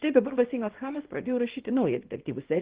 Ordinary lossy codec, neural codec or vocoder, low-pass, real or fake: Opus, 16 kbps; codec, 24 kHz, 0.9 kbps, WavTokenizer, small release; 3.6 kHz; fake